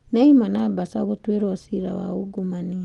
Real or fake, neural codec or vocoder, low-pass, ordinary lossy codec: real; none; 10.8 kHz; Opus, 24 kbps